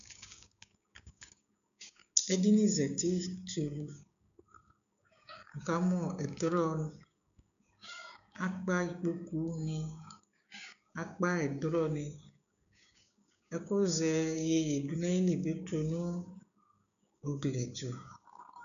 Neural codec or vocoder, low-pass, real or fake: codec, 16 kHz, 6 kbps, DAC; 7.2 kHz; fake